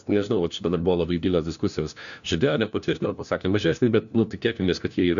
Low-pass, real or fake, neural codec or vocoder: 7.2 kHz; fake; codec, 16 kHz, 1 kbps, FunCodec, trained on LibriTTS, 50 frames a second